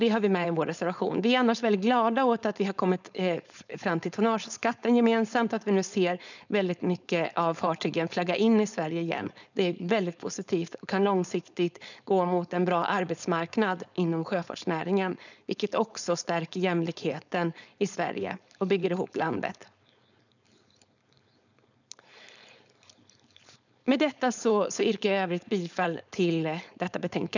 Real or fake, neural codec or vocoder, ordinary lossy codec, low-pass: fake; codec, 16 kHz, 4.8 kbps, FACodec; none; 7.2 kHz